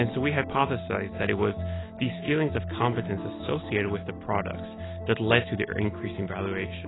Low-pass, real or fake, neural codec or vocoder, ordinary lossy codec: 7.2 kHz; real; none; AAC, 16 kbps